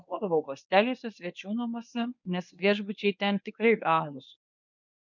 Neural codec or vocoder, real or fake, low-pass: codec, 24 kHz, 0.9 kbps, WavTokenizer, medium speech release version 1; fake; 7.2 kHz